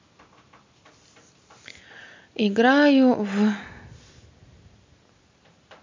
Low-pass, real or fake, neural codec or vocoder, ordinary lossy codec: 7.2 kHz; real; none; MP3, 64 kbps